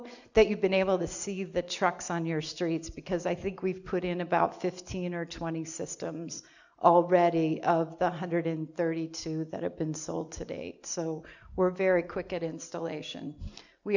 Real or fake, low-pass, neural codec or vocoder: real; 7.2 kHz; none